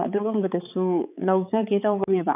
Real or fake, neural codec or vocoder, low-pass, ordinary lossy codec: fake; codec, 16 kHz, 4 kbps, X-Codec, HuBERT features, trained on balanced general audio; 3.6 kHz; none